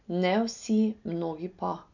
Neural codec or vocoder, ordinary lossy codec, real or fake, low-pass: none; none; real; 7.2 kHz